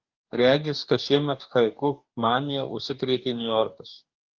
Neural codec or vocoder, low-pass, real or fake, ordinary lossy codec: codec, 44.1 kHz, 2.6 kbps, DAC; 7.2 kHz; fake; Opus, 32 kbps